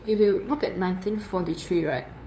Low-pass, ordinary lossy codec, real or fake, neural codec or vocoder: none; none; fake; codec, 16 kHz, 2 kbps, FunCodec, trained on LibriTTS, 25 frames a second